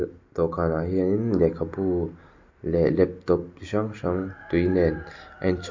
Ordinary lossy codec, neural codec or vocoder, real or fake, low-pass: MP3, 48 kbps; none; real; 7.2 kHz